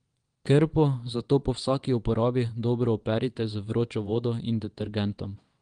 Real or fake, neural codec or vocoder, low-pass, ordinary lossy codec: fake; vocoder, 22.05 kHz, 80 mel bands, WaveNeXt; 9.9 kHz; Opus, 24 kbps